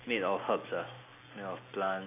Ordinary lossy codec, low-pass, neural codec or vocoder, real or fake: AAC, 24 kbps; 3.6 kHz; none; real